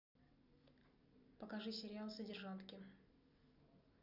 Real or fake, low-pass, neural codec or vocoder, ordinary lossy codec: real; 5.4 kHz; none; none